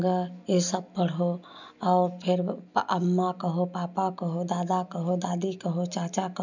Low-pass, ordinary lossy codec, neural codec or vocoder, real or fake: 7.2 kHz; none; none; real